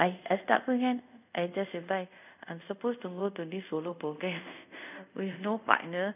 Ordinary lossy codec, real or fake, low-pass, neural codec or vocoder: none; fake; 3.6 kHz; codec, 24 kHz, 0.5 kbps, DualCodec